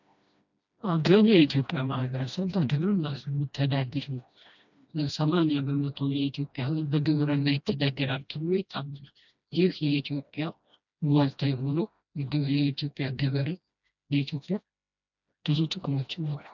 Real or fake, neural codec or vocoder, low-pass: fake; codec, 16 kHz, 1 kbps, FreqCodec, smaller model; 7.2 kHz